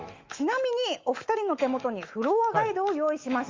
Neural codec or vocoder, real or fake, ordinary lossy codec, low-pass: autoencoder, 48 kHz, 128 numbers a frame, DAC-VAE, trained on Japanese speech; fake; Opus, 32 kbps; 7.2 kHz